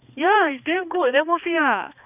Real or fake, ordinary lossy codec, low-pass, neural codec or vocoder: fake; none; 3.6 kHz; codec, 16 kHz, 2 kbps, X-Codec, HuBERT features, trained on balanced general audio